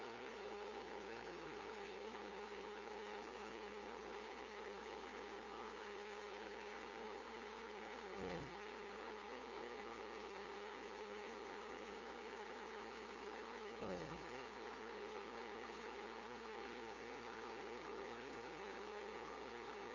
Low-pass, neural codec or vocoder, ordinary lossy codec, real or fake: 7.2 kHz; codec, 16 kHz, 2 kbps, FunCodec, trained on LibriTTS, 25 frames a second; none; fake